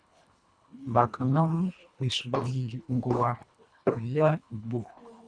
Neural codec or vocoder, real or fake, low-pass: codec, 24 kHz, 1.5 kbps, HILCodec; fake; 9.9 kHz